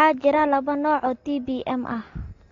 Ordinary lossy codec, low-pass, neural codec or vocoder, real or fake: AAC, 32 kbps; 7.2 kHz; none; real